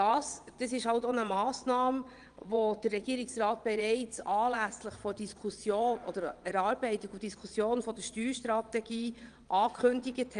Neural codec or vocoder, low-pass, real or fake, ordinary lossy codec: vocoder, 22.05 kHz, 80 mel bands, Vocos; 9.9 kHz; fake; Opus, 32 kbps